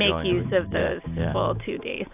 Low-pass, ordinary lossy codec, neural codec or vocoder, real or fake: 3.6 kHz; AAC, 32 kbps; none; real